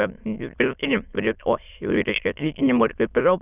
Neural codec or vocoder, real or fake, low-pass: autoencoder, 22.05 kHz, a latent of 192 numbers a frame, VITS, trained on many speakers; fake; 3.6 kHz